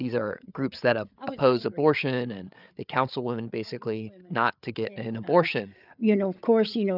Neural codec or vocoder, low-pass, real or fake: codec, 16 kHz, 8 kbps, FreqCodec, larger model; 5.4 kHz; fake